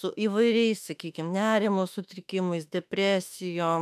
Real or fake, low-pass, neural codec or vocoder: fake; 14.4 kHz; autoencoder, 48 kHz, 32 numbers a frame, DAC-VAE, trained on Japanese speech